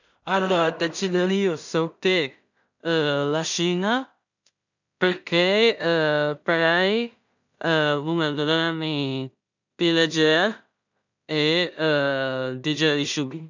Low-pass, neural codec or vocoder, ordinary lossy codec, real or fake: 7.2 kHz; codec, 16 kHz in and 24 kHz out, 0.4 kbps, LongCat-Audio-Codec, two codebook decoder; none; fake